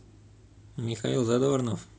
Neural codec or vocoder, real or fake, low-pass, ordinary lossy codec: none; real; none; none